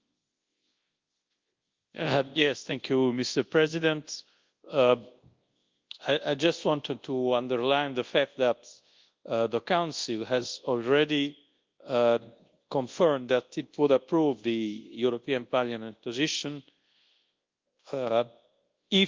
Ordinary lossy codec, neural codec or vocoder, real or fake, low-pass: Opus, 32 kbps; codec, 24 kHz, 0.9 kbps, WavTokenizer, large speech release; fake; 7.2 kHz